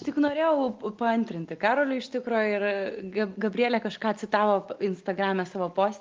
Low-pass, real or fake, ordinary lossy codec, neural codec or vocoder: 7.2 kHz; real; Opus, 32 kbps; none